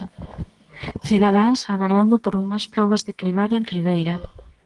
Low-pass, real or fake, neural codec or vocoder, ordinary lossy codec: 10.8 kHz; fake; codec, 24 kHz, 0.9 kbps, WavTokenizer, medium music audio release; Opus, 24 kbps